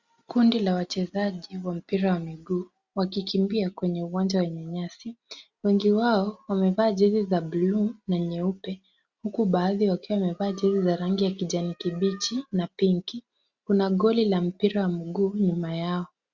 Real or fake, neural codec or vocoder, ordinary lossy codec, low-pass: real; none; Opus, 64 kbps; 7.2 kHz